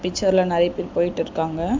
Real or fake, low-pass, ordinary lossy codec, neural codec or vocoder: real; 7.2 kHz; MP3, 64 kbps; none